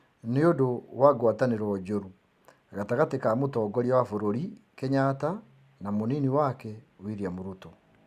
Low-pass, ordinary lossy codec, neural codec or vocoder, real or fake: 14.4 kHz; Opus, 64 kbps; none; real